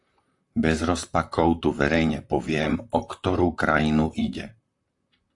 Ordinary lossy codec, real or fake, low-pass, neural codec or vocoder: AAC, 64 kbps; fake; 10.8 kHz; vocoder, 44.1 kHz, 128 mel bands, Pupu-Vocoder